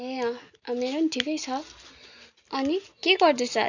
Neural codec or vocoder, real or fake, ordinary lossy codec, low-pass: none; real; none; 7.2 kHz